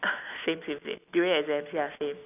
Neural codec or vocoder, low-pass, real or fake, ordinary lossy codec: none; 3.6 kHz; real; none